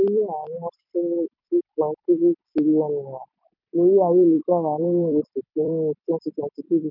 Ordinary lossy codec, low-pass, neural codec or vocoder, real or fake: none; 5.4 kHz; vocoder, 44.1 kHz, 128 mel bands every 256 samples, BigVGAN v2; fake